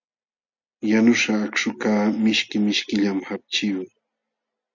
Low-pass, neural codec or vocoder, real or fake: 7.2 kHz; none; real